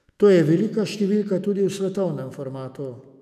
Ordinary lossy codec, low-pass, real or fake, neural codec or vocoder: none; 14.4 kHz; fake; autoencoder, 48 kHz, 128 numbers a frame, DAC-VAE, trained on Japanese speech